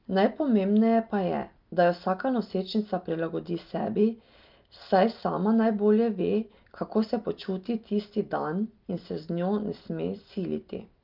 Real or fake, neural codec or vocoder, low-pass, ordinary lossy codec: real; none; 5.4 kHz; Opus, 32 kbps